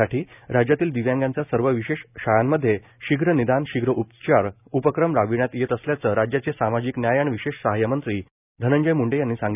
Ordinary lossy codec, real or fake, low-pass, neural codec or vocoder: none; real; 3.6 kHz; none